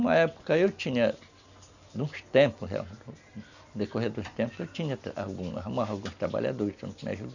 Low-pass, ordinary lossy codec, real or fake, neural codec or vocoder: 7.2 kHz; none; real; none